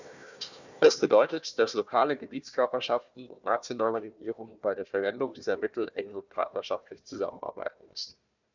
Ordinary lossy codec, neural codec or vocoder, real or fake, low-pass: none; codec, 16 kHz, 1 kbps, FunCodec, trained on Chinese and English, 50 frames a second; fake; 7.2 kHz